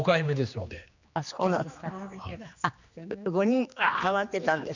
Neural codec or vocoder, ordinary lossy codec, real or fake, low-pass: codec, 16 kHz, 2 kbps, X-Codec, HuBERT features, trained on general audio; none; fake; 7.2 kHz